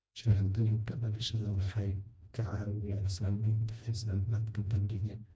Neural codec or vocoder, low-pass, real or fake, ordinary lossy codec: codec, 16 kHz, 1 kbps, FreqCodec, smaller model; none; fake; none